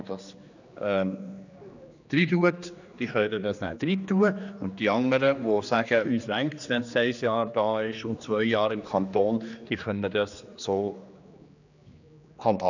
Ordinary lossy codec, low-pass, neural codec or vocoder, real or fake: none; 7.2 kHz; codec, 16 kHz, 2 kbps, X-Codec, HuBERT features, trained on general audio; fake